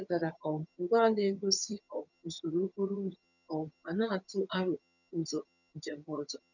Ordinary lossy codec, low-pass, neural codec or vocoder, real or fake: none; 7.2 kHz; vocoder, 22.05 kHz, 80 mel bands, HiFi-GAN; fake